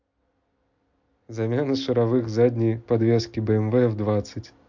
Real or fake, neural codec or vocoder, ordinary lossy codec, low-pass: real; none; MP3, 48 kbps; 7.2 kHz